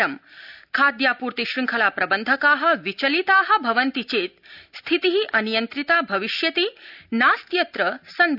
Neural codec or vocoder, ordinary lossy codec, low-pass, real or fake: none; none; 5.4 kHz; real